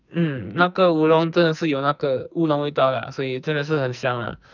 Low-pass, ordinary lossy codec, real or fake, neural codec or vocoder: 7.2 kHz; none; fake; codec, 44.1 kHz, 2.6 kbps, SNAC